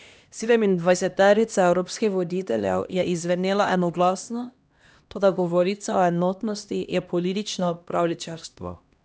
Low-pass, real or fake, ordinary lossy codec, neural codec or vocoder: none; fake; none; codec, 16 kHz, 1 kbps, X-Codec, HuBERT features, trained on LibriSpeech